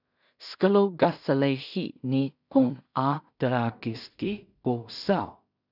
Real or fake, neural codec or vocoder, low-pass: fake; codec, 16 kHz in and 24 kHz out, 0.4 kbps, LongCat-Audio-Codec, two codebook decoder; 5.4 kHz